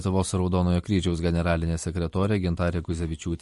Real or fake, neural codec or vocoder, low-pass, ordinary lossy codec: fake; vocoder, 44.1 kHz, 128 mel bands every 256 samples, BigVGAN v2; 14.4 kHz; MP3, 48 kbps